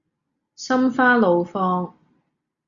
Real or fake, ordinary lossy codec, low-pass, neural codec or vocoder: real; Opus, 64 kbps; 7.2 kHz; none